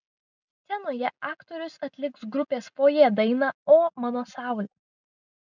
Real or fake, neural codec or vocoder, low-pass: real; none; 7.2 kHz